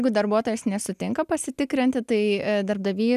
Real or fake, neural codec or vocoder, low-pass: real; none; 14.4 kHz